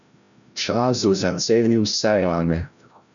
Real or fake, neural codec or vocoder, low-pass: fake; codec, 16 kHz, 0.5 kbps, FreqCodec, larger model; 7.2 kHz